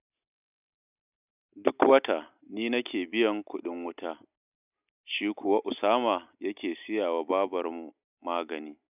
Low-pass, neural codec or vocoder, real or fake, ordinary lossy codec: 3.6 kHz; none; real; none